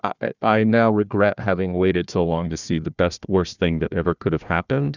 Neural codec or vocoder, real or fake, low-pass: codec, 16 kHz, 1 kbps, FunCodec, trained on Chinese and English, 50 frames a second; fake; 7.2 kHz